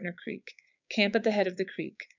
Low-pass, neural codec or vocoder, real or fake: 7.2 kHz; codec, 16 kHz, 6 kbps, DAC; fake